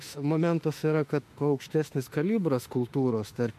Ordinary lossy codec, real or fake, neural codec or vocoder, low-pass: MP3, 64 kbps; fake; autoencoder, 48 kHz, 32 numbers a frame, DAC-VAE, trained on Japanese speech; 14.4 kHz